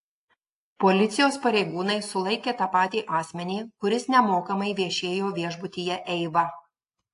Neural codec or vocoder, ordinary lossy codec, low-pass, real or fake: none; AAC, 48 kbps; 10.8 kHz; real